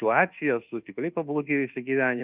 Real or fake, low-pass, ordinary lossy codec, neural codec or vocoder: fake; 3.6 kHz; Opus, 32 kbps; codec, 24 kHz, 1.2 kbps, DualCodec